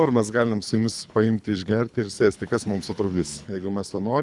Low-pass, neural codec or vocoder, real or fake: 10.8 kHz; codec, 24 kHz, 3 kbps, HILCodec; fake